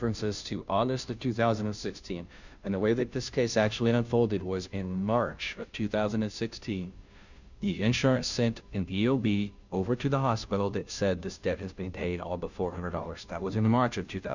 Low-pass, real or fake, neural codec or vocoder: 7.2 kHz; fake; codec, 16 kHz, 0.5 kbps, FunCodec, trained on Chinese and English, 25 frames a second